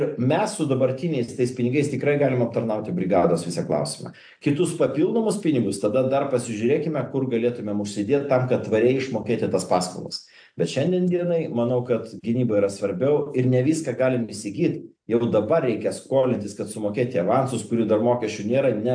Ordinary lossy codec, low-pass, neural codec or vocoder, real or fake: AAC, 64 kbps; 9.9 kHz; none; real